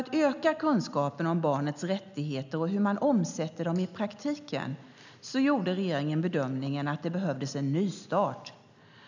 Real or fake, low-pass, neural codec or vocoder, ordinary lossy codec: real; 7.2 kHz; none; none